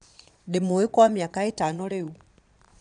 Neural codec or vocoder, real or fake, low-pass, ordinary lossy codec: vocoder, 22.05 kHz, 80 mel bands, Vocos; fake; 9.9 kHz; none